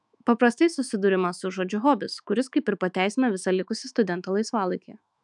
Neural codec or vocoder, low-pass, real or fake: autoencoder, 48 kHz, 128 numbers a frame, DAC-VAE, trained on Japanese speech; 10.8 kHz; fake